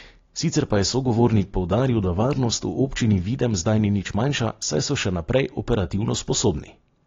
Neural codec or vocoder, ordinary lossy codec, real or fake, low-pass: none; AAC, 32 kbps; real; 7.2 kHz